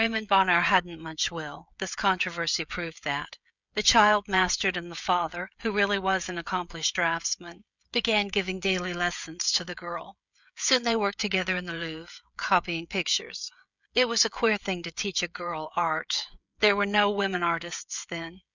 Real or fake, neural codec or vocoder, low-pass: fake; codec, 16 kHz, 16 kbps, FreqCodec, smaller model; 7.2 kHz